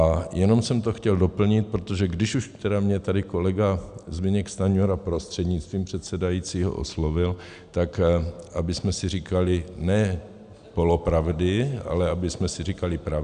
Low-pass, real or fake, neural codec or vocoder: 9.9 kHz; real; none